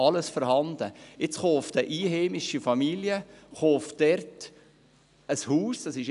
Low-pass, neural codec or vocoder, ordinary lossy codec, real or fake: 10.8 kHz; none; none; real